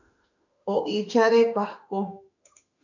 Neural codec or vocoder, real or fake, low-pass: autoencoder, 48 kHz, 32 numbers a frame, DAC-VAE, trained on Japanese speech; fake; 7.2 kHz